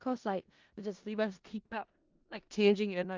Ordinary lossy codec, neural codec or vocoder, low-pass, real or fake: Opus, 32 kbps; codec, 16 kHz in and 24 kHz out, 0.4 kbps, LongCat-Audio-Codec, four codebook decoder; 7.2 kHz; fake